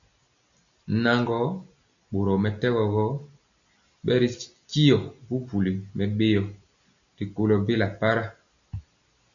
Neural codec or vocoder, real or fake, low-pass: none; real; 7.2 kHz